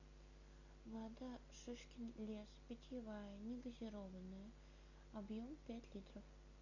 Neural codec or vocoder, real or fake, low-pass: none; real; 7.2 kHz